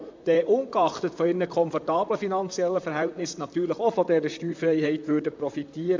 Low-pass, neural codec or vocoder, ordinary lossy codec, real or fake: 7.2 kHz; vocoder, 44.1 kHz, 128 mel bands, Pupu-Vocoder; MP3, 64 kbps; fake